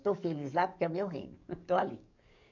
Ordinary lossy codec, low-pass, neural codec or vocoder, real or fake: none; 7.2 kHz; codec, 44.1 kHz, 7.8 kbps, DAC; fake